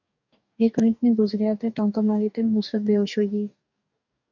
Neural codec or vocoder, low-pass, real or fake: codec, 44.1 kHz, 2.6 kbps, DAC; 7.2 kHz; fake